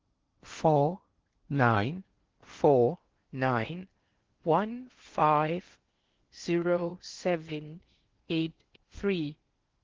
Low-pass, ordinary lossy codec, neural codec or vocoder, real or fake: 7.2 kHz; Opus, 16 kbps; codec, 16 kHz in and 24 kHz out, 0.8 kbps, FocalCodec, streaming, 65536 codes; fake